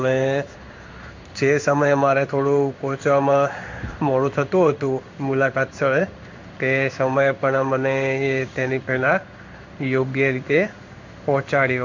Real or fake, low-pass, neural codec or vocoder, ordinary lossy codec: fake; 7.2 kHz; codec, 16 kHz in and 24 kHz out, 1 kbps, XY-Tokenizer; MP3, 64 kbps